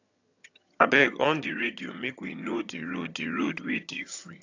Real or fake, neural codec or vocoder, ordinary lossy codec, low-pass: fake; vocoder, 22.05 kHz, 80 mel bands, HiFi-GAN; AAC, 48 kbps; 7.2 kHz